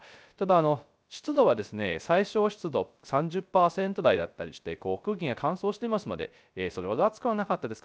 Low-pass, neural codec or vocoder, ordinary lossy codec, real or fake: none; codec, 16 kHz, 0.3 kbps, FocalCodec; none; fake